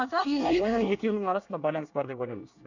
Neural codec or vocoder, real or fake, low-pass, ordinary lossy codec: codec, 24 kHz, 1 kbps, SNAC; fake; 7.2 kHz; none